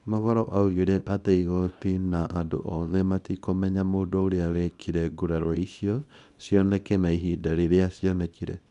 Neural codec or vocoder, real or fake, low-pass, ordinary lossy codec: codec, 24 kHz, 0.9 kbps, WavTokenizer, small release; fake; 10.8 kHz; none